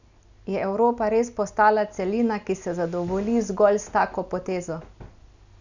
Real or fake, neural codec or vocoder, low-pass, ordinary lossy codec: real; none; 7.2 kHz; none